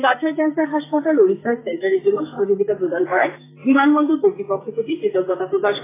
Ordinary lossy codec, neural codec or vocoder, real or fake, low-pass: AAC, 16 kbps; codec, 44.1 kHz, 2.6 kbps, SNAC; fake; 3.6 kHz